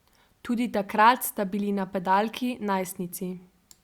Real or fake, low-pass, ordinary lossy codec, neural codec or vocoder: fake; 19.8 kHz; Opus, 64 kbps; vocoder, 44.1 kHz, 128 mel bands every 256 samples, BigVGAN v2